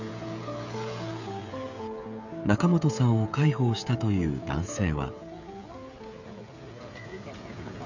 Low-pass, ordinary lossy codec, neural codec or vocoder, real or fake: 7.2 kHz; none; codec, 16 kHz, 16 kbps, FreqCodec, smaller model; fake